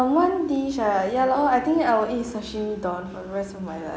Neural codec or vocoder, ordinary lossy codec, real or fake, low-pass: none; none; real; none